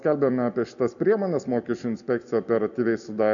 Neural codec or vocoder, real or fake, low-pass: none; real; 7.2 kHz